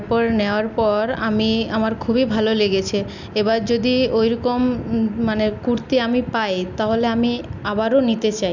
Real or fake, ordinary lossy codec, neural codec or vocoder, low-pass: real; none; none; 7.2 kHz